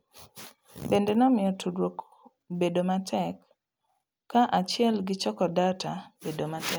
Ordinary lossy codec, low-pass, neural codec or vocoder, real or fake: none; none; none; real